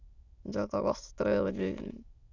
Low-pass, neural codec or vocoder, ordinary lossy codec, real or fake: 7.2 kHz; autoencoder, 22.05 kHz, a latent of 192 numbers a frame, VITS, trained on many speakers; Opus, 64 kbps; fake